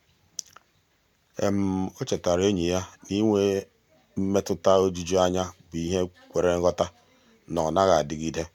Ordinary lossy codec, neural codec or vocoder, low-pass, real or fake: MP3, 96 kbps; none; 19.8 kHz; real